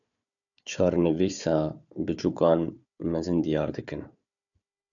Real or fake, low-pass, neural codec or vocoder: fake; 7.2 kHz; codec, 16 kHz, 4 kbps, FunCodec, trained on Chinese and English, 50 frames a second